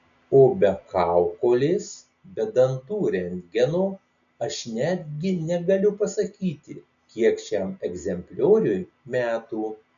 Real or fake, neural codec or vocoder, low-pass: real; none; 7.2 kHz